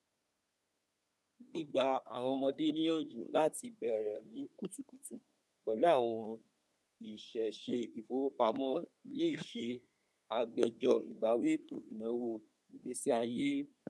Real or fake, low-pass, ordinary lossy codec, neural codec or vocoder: fake; none; none; codec, 24 kHz, 1 kbps, SNAC